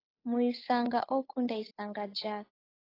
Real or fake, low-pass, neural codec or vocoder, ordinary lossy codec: fake; 5.4 kHz; codec, 16 kHz, 8 kbps, FunCodec, trained on Chinese and English, 25 frames a second; AAC, 24 kbps